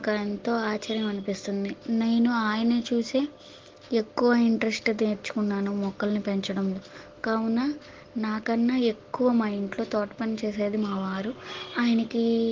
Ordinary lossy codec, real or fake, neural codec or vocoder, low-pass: Opus, 16 kbps; real; none; 7.2 kHz